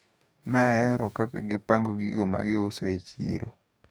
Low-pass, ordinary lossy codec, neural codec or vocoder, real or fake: none; none; codec, 44.1 kHz, 2.6 kbps, DAC; fake